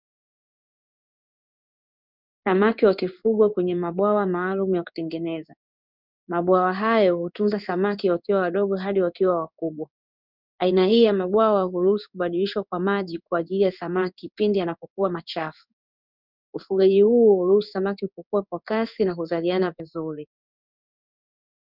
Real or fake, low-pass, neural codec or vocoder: fake; 5.4 kHz; codec, 16 kHz in and 24 kHz out, 1 kbps, XY-Tokenizer